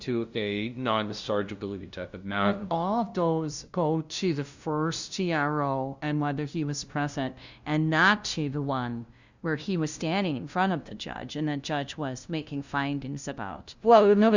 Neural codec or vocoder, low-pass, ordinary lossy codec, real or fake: codec, 16 kHz, 0.5 kbps, FunCodec, trained on LibriTTS, 25 frames a second; 7.2 kHz; Opus, 64 kbps; fake